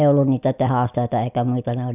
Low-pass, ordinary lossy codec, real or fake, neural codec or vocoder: 3.6 kHz; none; real; none